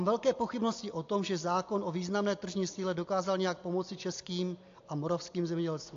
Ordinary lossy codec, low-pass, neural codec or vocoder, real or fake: AAC, 48 kbps; 7.2 kHz; none; real